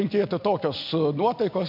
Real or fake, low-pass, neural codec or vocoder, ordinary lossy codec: real; 5.4 kHz; none; MP3, 48 kbps